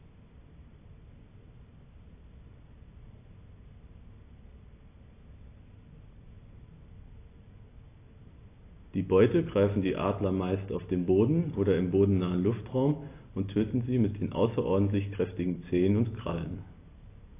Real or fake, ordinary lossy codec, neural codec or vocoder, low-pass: real; AAC, 24 kbps; none; 3.6 kHz